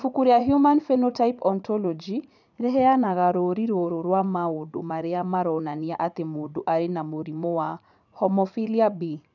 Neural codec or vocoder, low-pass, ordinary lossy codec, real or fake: vocoder, 44.1 kHz, 80 mel bands, Vocos; 7.2 kHz; none; fake